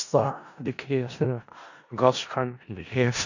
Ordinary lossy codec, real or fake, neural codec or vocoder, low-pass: none; fake; codec, 16 kHz in and 24 kHz out, 0.4 kbps, LongCat-Audio-Codec, four codebook decoder; 7.2 kHz